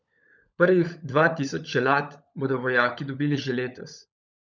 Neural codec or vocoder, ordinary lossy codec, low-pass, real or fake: codec, 16 kHz, 16 kbps, FunCodec, trained on LibriTTS, 50 frames a second; none; 7.2 kHz; fake